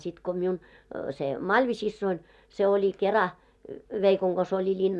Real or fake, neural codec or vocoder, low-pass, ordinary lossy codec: real; none; none; none